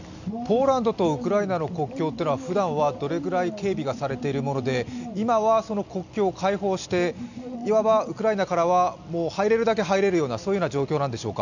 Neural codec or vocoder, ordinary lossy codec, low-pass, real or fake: none; none; 7.2 kHz; real